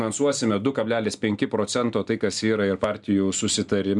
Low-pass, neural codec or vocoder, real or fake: 10.8 kHz; none; real